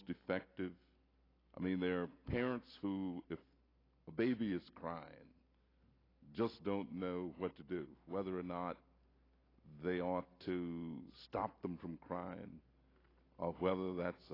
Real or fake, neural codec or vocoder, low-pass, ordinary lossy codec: real; none; 5.4 kHz; AAC, 24 kbps